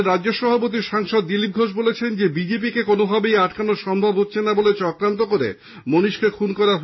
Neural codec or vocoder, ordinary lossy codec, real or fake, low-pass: none; MP3, 24 kbps; real; 7.2 kHz